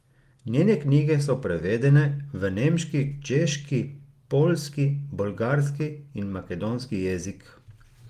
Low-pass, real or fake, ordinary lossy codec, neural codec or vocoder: 14.4 kHz; real; Opus, 24 kbps; none